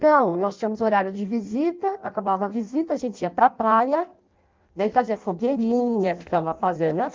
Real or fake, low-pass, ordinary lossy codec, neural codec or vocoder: fake; 7.2 kHz; Opus, 32 kbps; codec, 16 kHz in and 24 kHz out, 0.6 kbps, FireRedTTS-2 codec